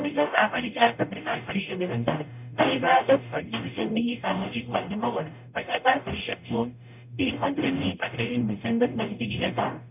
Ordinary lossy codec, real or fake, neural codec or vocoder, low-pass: none; fake; codec, 44.1 kHz, 0.9 kbps, DAC; 3.6 kHz